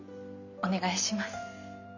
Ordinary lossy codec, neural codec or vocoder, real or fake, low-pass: none; none; real; 7.2 kHz